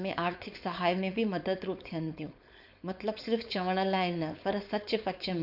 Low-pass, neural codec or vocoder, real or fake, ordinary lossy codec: 5.4 kHz; codec, 16 kHz, 4.8 kbps, FACodec; fake; none